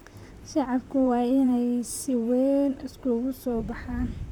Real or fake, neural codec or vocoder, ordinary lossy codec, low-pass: fake; vocoder, 44.1 kHz, 128 mel bands, Pupu-Vocoder; none; 19.8 kHz